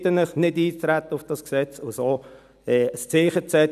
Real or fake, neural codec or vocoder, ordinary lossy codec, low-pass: real; none; none; 14.4 kHz